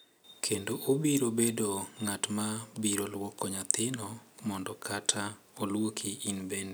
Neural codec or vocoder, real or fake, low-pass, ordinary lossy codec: none; real; none; none